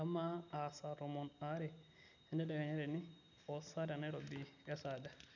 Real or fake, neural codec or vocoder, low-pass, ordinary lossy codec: real; none; 7.2 kHz; none